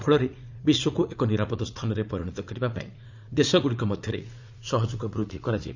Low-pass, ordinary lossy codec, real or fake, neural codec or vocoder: 7.2 kHz; MP3, 64 kbps; fake; vocoder, 44.1 kHz, 80 mel bands, Vocos